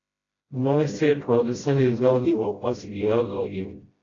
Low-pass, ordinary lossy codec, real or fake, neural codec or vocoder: 7.2 kHz; AAC, 32 kbps; fake; codec, 16 kHz, 0.5 kbps, FreqCodec, smaller model